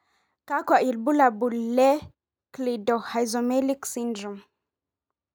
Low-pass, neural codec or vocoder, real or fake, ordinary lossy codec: none; none; real; none